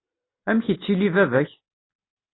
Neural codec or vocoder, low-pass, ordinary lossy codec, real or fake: none; 7.2 kHz; AAC, 16 kbps; real